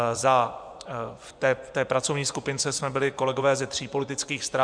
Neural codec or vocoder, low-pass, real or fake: vocoder, 24 kHz, 100 mel bands, Vocos; 9.9 kHz; fake